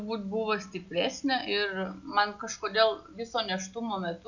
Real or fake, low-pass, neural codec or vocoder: real; 7.2 kHz; none